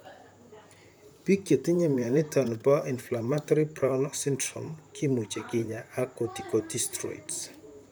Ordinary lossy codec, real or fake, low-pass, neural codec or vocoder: none; fake; none; vocoder, 44.1 kHz, 128 mel bands, Pupu-Vocoder